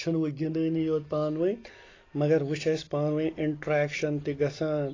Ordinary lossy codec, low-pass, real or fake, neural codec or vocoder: AAC, 32 kbps; 7.2 kHz; real; none